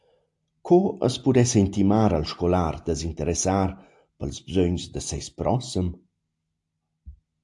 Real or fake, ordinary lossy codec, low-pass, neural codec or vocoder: real; AAC, 64 kbps; 10.8 kHz; none